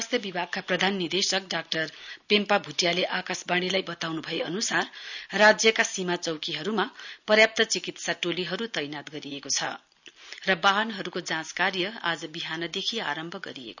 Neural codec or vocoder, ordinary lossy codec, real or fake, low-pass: none; none; real; 7.2 kHz